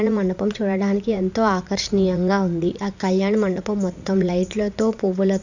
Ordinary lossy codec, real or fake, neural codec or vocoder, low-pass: none; fake; vocoder, 44.1 kHz, 80 mel bands, Vocos; 7.2 kHz